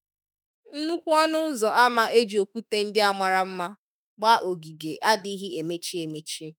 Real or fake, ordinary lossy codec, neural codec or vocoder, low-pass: fake; none; autoencoder, 48 kHz, 32 numbers a frame, DAC-VAE, trained on Japanese speech; none